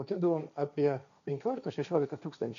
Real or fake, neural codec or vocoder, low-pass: fake; codec, 16 kHz, 1.1 kbps, Voila-Tokenizer; 7.2 kHz